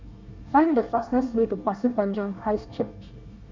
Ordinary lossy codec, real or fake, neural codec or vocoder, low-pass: MP3, 64 kbps; fake; codec, 24 kHz, 1 kbps, SNAC; 7.2 kHz